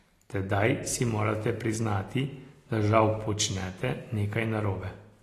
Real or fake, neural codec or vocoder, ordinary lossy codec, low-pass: real; none; AAC, 48 kbps; 14.4 kHz